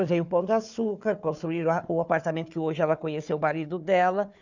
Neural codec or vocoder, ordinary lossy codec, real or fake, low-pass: codec, 16 kHz, 4 kbps, FunCodec, trained on Chinese and English, 50 frames a second; none; fake; 7.2 kHz